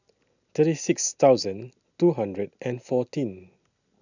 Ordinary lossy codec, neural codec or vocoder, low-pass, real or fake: none; none; 7.2 kHz; real